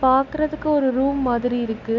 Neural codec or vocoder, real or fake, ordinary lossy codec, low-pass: none; real; none; 7.2 kHz